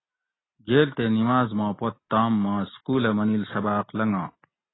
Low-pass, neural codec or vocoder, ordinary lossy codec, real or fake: 7.2 kHz; none; AAC, 16 kbps; real